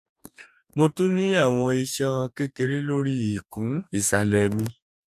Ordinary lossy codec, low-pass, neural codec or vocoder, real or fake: none; 14.4 kHz; codec, 44.1 kHz, 2.6 kbps, DAC; fake